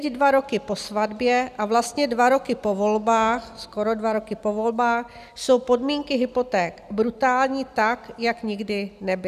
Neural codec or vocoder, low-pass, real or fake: none; 14.4 kHz; real